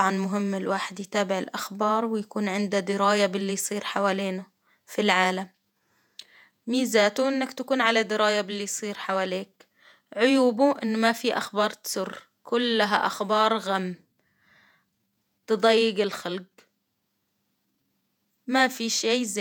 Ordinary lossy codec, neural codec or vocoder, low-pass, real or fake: none; vocoder, 48 kHz, 128 mel bands, Vocos; 19.8 kHz; fake